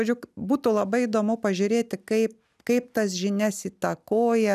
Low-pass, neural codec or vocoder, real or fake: 14.4 kHz; none; real